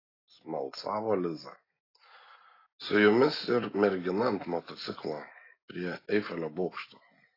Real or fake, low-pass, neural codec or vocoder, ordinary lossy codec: real; 5.4 kHz; none; AAC, 24 kbps